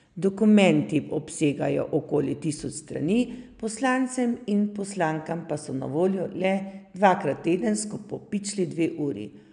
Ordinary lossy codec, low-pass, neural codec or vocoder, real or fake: none; 9.9 kHz; none; real